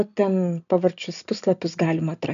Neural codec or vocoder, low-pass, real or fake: none; 7.2 kHz; real